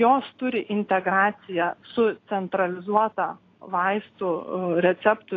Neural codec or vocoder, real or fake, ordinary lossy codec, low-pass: none; real; AAC, 32 kbps; 7.2 kHz